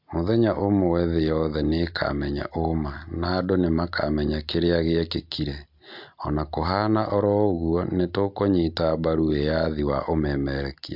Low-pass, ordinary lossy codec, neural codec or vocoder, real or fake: 5.4 kHz; MP3, 48 kbps; none; real